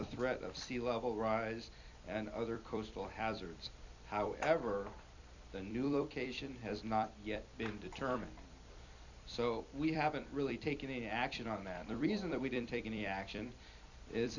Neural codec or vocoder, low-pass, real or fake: vocoder, 44.1 kHz, 128 mel bands every 256 samples, BigVGAN v2; 7.2 kHz; fake